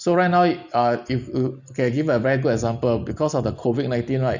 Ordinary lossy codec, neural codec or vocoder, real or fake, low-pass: none; none; real; 7.2 kHz